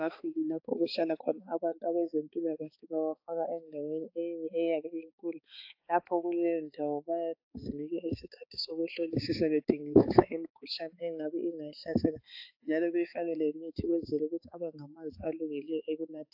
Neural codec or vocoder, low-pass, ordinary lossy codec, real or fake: codec, 16 kHz, 4 kbps, X-Codec, HuBERT features, trained on balanced general audio; 5.4 kHz; AAC, 48 kbps; fake